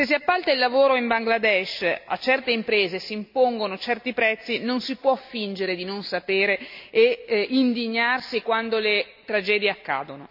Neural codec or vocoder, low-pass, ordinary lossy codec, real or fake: none; 5.4 kHz; MP3, 48 kbps; real